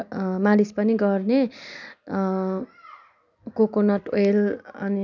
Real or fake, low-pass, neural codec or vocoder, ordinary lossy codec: real; 7.2 kHz; none; none